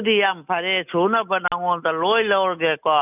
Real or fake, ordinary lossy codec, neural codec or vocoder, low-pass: real; none; none; 3.6 kHz